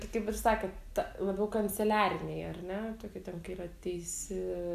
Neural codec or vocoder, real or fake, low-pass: none; real; 14.4 kHz